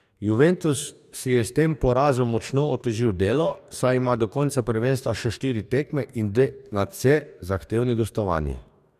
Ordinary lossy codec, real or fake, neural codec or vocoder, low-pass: none; fake; codec, 44.1 kHz, 2.6 kbps, DAC; 14.4 kHz